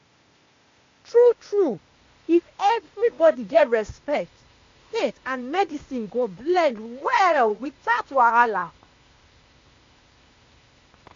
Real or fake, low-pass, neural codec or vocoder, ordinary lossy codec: fake; 7.2 kHz; codec, 16 kHz, 0.8 kbps, ZipCodec; AAC, 48 kbps